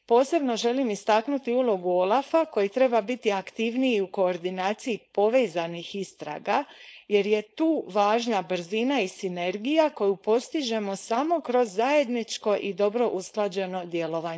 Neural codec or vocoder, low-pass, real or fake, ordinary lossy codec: codec, 16 kHz, 4.8 kbps, FACodec; none; fake; none